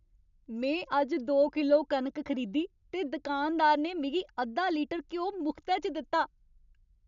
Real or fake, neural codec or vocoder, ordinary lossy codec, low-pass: real; none; none; 7.2 kHz